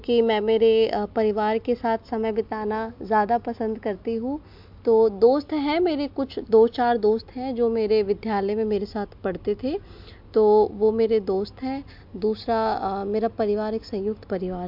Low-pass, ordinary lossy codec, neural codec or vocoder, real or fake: 5.4 kHz; MP3, 48 kbps; none; real